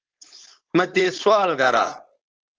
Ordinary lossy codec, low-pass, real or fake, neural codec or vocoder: Opus, 16 kbps; 7.2 kHz; fake; codec, 16 kHz, 4.8 kbps, FACodec